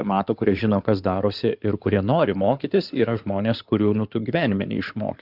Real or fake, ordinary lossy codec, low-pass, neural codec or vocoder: fake; Opus, 64 kbps; 5.4 kHz; codec, 16 kHz in and 24 kHz out, 2.2 kbps, FireRedTTS-2 codec